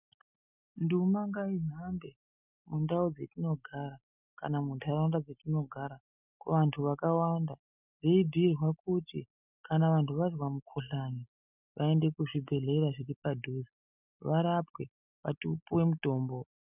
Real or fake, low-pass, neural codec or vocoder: real; 3.6 kHz; none